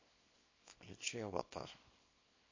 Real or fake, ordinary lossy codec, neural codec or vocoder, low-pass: fake; MP3, 32 kbps; codec, 24 kHz, 0.9 kbps, WavTokenizer, small release; 7.2 kHz